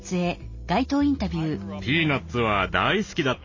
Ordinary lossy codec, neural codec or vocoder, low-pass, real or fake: MP3, 32 kbps; none; 7.2 kHz; real